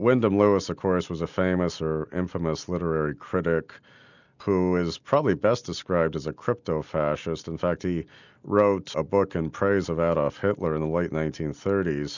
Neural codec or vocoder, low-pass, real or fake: none; 7.2 kHz; real